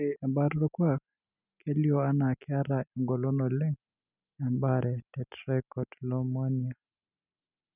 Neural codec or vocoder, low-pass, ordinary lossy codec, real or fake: none; 3.6 kHz; none; real